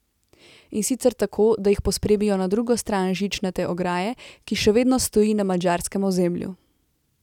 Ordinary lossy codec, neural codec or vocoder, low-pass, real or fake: none; none; 19.8 kHz; real